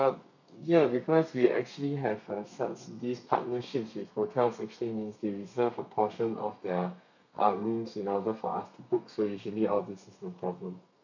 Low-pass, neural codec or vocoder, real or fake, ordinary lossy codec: 7.2 kHz; codec, 32 kHz, 1.9 kbps, SNAC; fake; none